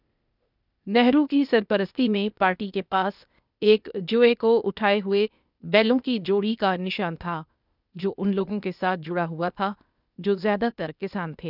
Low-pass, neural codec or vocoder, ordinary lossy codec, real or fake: 5.4 kHz; codec, 16 kHz, 0.8 kbps, ZipCodec; none; fake